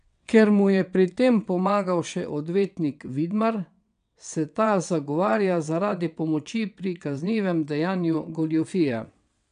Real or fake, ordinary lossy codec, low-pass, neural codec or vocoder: fake; none; 9.9 kHz; vocoder, 22.05 kHz, 80 mel bands, WaveNeXt